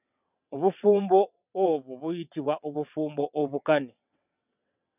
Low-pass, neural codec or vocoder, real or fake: 3.6 kHz; vocoder, 22.05 kHz, 80 mel bands, WaveNeXt; fake